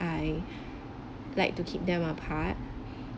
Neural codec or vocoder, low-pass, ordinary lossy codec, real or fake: none; none; none; real